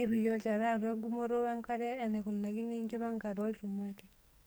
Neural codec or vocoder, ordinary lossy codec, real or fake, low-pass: codec, 44.1 kHz, 2.6 kbps, SNAC; none; fake; none